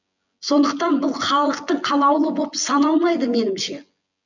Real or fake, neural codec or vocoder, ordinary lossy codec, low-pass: fake; vocoder, 24 kHz, 100 mel bands, Vocos; none; 7.2 kHz